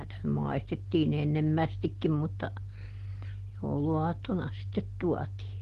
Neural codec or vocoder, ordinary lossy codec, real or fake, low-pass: none; Opus, 16 kbps; real; 14.4 kHz